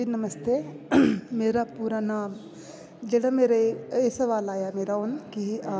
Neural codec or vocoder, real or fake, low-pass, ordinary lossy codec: none; real; none; none